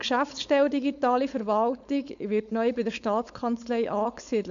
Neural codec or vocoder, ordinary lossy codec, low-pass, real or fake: codec, 16 kHz, 4.8 kbps, FACodec; none; 7.2 kHz; fake